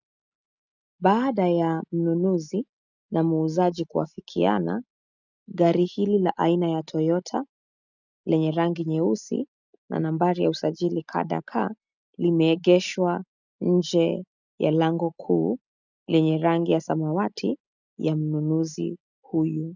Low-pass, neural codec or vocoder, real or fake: 7.2 kHz; none; real